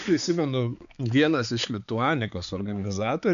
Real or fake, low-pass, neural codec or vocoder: fake; 7.2 kHz; codec, 16 kHz, 4 kbps, X-Codec, HuBERT features, trained on balanced general audio